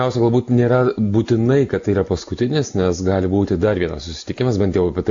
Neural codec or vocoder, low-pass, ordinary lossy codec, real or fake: none; 7.2 kHz; AAC, 64 kbps; real